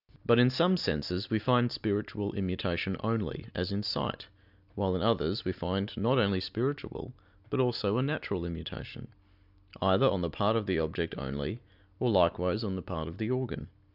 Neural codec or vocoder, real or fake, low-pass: none; real; 5.4 kHz